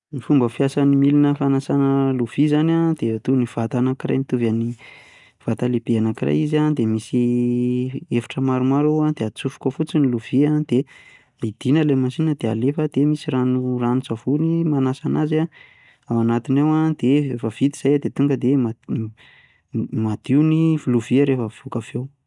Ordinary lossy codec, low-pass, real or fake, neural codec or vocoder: none; 10.8 kHz; real; none